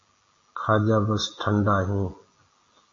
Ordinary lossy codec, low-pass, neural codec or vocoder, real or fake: AAC, 32 kbps; 7.2 kHz; none; real